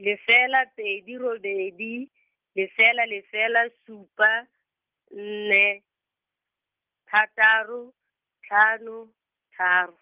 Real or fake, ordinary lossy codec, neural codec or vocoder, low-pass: real; Opus, 32 kbps; none; 3.6 kHz